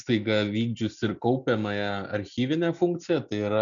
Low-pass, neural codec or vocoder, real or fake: 7.2 kHz; none; real